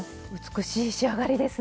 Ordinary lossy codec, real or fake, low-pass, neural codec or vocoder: none; real; none; none